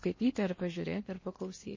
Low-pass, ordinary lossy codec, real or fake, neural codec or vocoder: 7.2 kHz; MP3, 32 kbps; fake; codec, 16 kHz in and 24 kHz out, 0.8 kbps, FocalCodec, streaming, 65536 codes